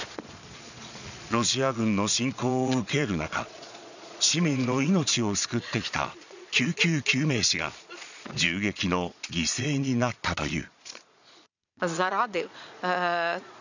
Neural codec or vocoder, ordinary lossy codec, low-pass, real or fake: vocoder, 22.05 kHz, 80 mel bands, Vocos; none; 7.2 kHz; fake